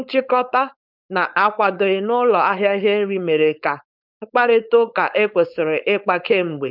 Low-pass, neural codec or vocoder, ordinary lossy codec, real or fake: 5.4 kHz; codec, 16 kHz, 4.8 kbps, FACodec; none; fake